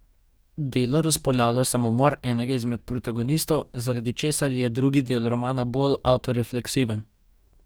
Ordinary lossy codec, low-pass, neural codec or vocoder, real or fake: none; none; codec, 44.1 kHz, 2.6 kbps, DAC; fake